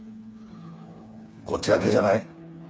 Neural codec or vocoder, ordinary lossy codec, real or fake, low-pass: codec, 16 kHz, 4 kbps, FreqCodec, smaller model; none; fake; none